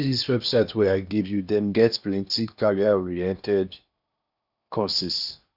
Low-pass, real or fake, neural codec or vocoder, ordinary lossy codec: 5.4 kHz; fake; codec, 16 kHz in and 24 kHz out, 0.8 kbps, FocalCodec, streaming, 65536 codes; none